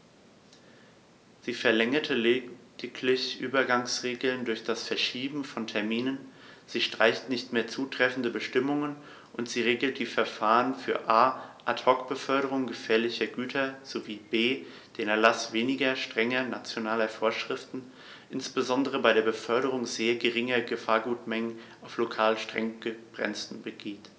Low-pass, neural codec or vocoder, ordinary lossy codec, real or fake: none; none; none; real